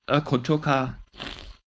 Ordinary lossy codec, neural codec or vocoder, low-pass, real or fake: none; codec, 16 kHz, 4.8 kbps, FACodec; none; fake